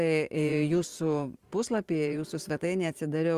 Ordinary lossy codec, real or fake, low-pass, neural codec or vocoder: Opus, 32 kbps; fake; 14.4 kHz; vocoder, 44.1 kHz, 128 mel bands every 512 samples, BigVGAN v2